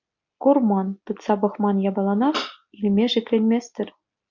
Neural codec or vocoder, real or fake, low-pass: none; real; 7.2 kHz